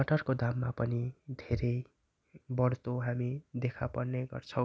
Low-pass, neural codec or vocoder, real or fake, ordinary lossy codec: none; none; real; none